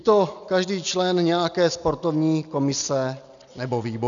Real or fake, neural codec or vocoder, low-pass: real; none; 7.2 kHz